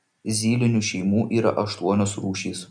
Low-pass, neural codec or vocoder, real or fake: 9.9 kHz; none; real